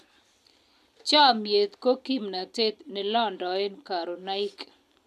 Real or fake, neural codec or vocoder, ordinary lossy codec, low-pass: real; none; none; 14.4 kHz